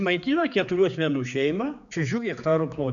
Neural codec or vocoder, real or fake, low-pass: codec, 16 kHz, 4 kbps, X-Codec, HuBERT features, trained on general audio; fake; 7.2 kHz